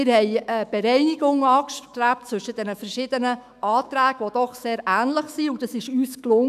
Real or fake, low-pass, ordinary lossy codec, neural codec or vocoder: fake; 14.4 kHz; none; autoencoder, 48 kHz, 128 numbers a frame, DAC-VAE, trained on Japanese speech